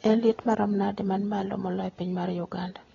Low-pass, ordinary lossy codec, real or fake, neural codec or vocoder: 7.2 kHz; AAC, 24 kbps; real; none